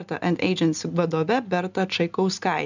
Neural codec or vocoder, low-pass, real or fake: none; 7.2 kHz; real